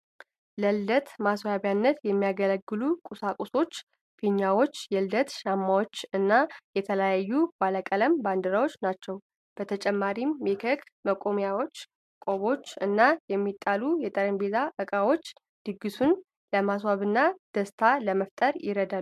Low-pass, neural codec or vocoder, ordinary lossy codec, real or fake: 14.4 kHz; none; MP3, 96 kbps; real